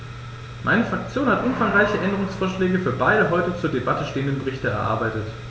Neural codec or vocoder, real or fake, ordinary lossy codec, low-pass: none; real; none; none